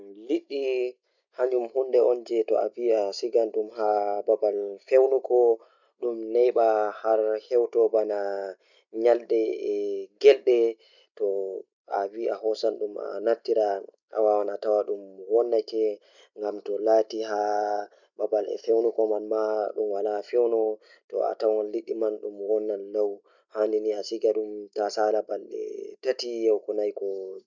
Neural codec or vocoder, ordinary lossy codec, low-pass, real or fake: none; none; 7.2 kHz; real